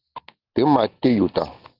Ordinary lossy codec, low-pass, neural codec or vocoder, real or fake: Opus, 24 kbps; 5.4 kHz; none; real